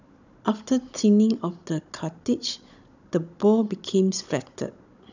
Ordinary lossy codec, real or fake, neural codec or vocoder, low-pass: none; fake; codec, 16 kHz, 16 kbps, FunCodec, trained on Chinese and English, 50 frames a second; 7.2 kHz